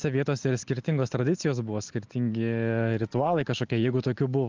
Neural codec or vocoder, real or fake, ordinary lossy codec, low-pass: none; real; Opus, 24 kbps; 7.2 kHz